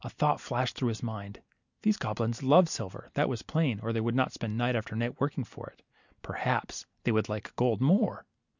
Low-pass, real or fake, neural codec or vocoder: 7.2 kHz; real; none